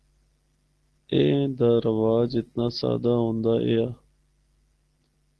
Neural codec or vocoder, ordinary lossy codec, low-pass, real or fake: none; Opus, 16 kbps; 10.8 kHz; real